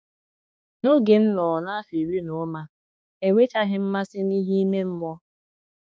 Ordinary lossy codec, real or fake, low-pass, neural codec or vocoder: none; fake; none; codec, 16 kHz, 2 kbps, X-Codec, HuBERT features, trained on balanced general audio